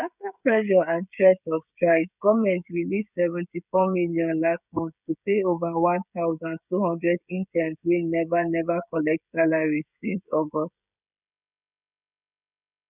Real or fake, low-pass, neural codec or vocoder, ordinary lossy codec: fake; 3.6 kHz; codec, 16 kHz, 16 kbps, FreqCodec, smaller model; none